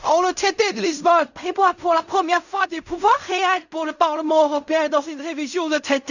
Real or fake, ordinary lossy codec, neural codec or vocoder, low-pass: fake; none; codec, 16 kHz in and 24 kHz out, 0.4 kbps, LongCat-Audio-Codec, fine tuned four codebook decoder; 7.2 kHz